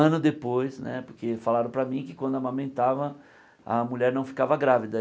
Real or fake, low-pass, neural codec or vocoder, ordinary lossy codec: real; none; none; none